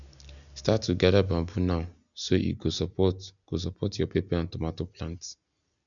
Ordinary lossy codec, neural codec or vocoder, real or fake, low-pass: none; none; real; 7.2 kHz